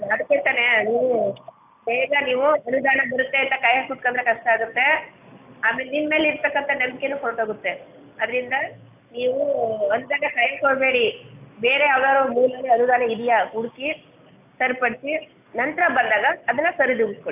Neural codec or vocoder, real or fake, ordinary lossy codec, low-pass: none; real; AAC, 32 kbps; 3.6 kHz